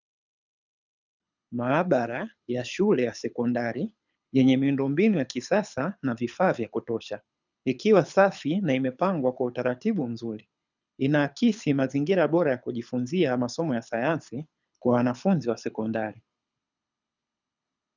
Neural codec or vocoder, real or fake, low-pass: codec, 24 kHz, 6 kbps, HILCodec; fake; 7.2 kHz